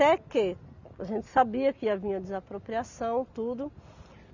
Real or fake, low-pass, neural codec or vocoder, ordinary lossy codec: real; 7.2 kHz; none; none